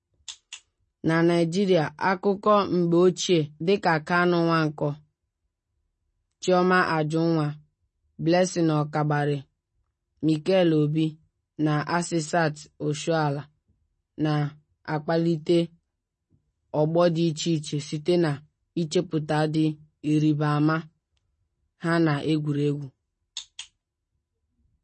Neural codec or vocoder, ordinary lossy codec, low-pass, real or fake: none; MP3, 32 kbps; 10.8 kHz; real